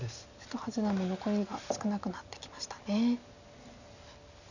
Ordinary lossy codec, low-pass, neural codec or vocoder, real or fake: none; 7.2 kHz; none; real